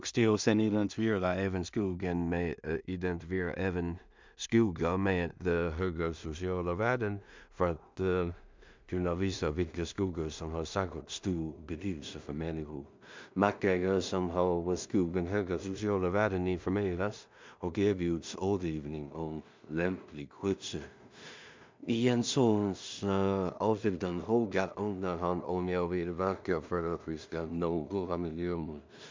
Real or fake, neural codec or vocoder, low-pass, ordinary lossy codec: fake; codec, 16 kHz in and 24 kHz out, 0.4 kbps, LongCat-Audio-Codec, two codebook decoder; 7.2 kHz; MP3, 64 kbps